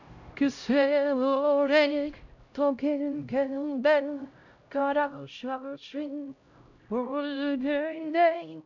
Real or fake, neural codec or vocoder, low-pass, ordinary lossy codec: fake; codec, 16 kHz, 0.5 kbps, X-Codec, HuBERT features, trained on LibriSpeech; 7.2 kHz; none